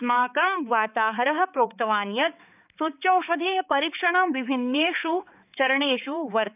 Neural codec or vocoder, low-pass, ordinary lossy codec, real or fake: codec, 16 kHz, 4 kbps, X-Codec, HuBERT features, trained on balanced general audio; 3.6 kHz; none; fake